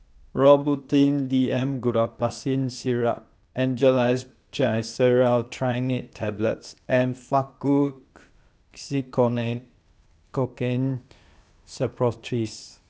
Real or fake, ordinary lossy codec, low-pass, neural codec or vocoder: fake; none; none; codec, 16 kHz, 0.8 kbps, ZipCodec